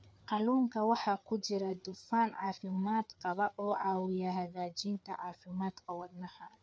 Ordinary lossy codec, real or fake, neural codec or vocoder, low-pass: none; fake; codec, 16 kHz, 4 kbps, FreqCodec, larger model; none